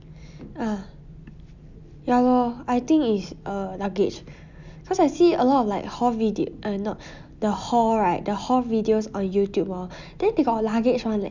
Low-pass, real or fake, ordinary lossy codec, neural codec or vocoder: 7.2 kHz; real; none; none